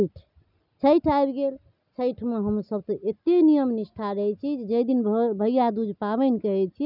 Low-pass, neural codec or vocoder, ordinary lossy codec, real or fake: 5.4 kHz; none; none; real